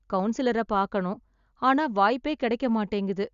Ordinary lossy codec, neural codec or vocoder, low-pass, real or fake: Opus, 64 kbps; none; 7.2 kHz; real